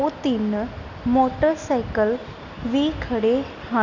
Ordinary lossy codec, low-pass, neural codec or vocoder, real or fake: none; 7.2 kHz; none; real